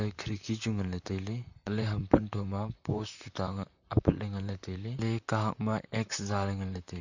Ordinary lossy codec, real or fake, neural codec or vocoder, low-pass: none; fake; vocoder, 44.1 kHz, 128 mel bands, Pupu-Vocoder; 7.2 kHz